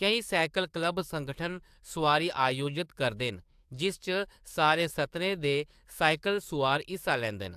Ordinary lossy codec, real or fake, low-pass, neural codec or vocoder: AAC, 96 kbps; fake; 14.4 kHz; codec, 44.1 kHz, 7.8 kbps, DAC